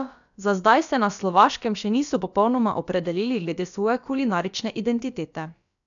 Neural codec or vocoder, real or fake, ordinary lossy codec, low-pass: codec, 16 kHz, about 1 kbps, DyCAST, with the encoder's durations; fake; none; 7.2 kHz